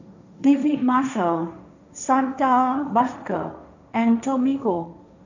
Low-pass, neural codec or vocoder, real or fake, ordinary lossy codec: 7.2 kHz; codec, 16 kHz, 1.1 kbps, Voila-Tokenizer; fake; none